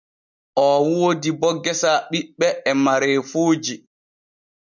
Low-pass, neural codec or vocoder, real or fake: 7.2 kHz; none; real